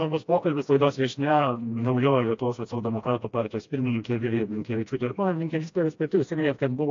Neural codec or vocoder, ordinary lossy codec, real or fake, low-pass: codec, 16 kHz, 1 kbps, FreqCodec, smaller model; AAC, 48 kbps; fake; 7.2 kHz